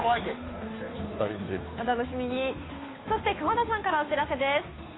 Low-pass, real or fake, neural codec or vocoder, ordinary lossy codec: 7.2 kHz; fake; codec, 16 kHz, 2 kbps, FunCodec, trained on Chinese and English, 25 frames a second; AAC, 16 kbps